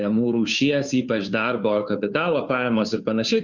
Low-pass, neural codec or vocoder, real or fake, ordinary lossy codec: 7.2 kHz; codec, 16 kHz, 2 kbps, FunCodec, trained on Chinese and English, 25 frames a second; fake; Opus, 64 kbps